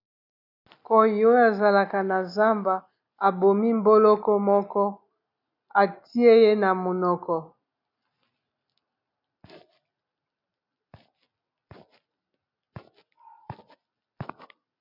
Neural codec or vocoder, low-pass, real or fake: none; 5.4 kHz; real